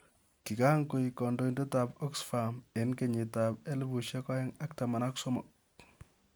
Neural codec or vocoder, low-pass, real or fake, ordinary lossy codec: none; none; real; none